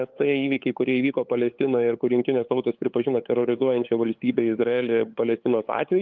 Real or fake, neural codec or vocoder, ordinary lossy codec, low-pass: fake; codec, 16 kHz, 8 kbps, FunCodec, trained on LibriTTS, 25 frames a second; Opus, 24 kbps; 7.2 kHz